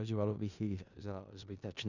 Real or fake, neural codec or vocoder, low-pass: fake; codec, 16 kHz in and 24 kHz out, 0.4 kbps, LongCat-Audio-Codec, four codebook decoder; 7.2 kHz